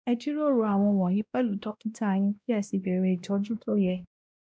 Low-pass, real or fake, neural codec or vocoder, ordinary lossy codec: none; fake; codec, 16 kHz, 1 kbps, X-Codec, WavLM features, trained on Multilingual LibriSpeech; none